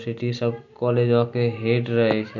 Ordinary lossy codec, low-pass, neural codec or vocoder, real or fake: none; 7.2 kHz; none; real